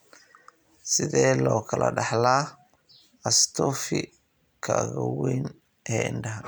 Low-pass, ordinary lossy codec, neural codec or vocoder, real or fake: none; none; none; real